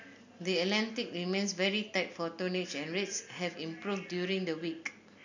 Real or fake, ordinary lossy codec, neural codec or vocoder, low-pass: real; none; none; 7.2 kHz